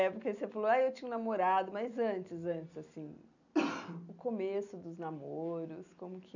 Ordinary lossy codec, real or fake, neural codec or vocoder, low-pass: none; real; none; 7.2 kHz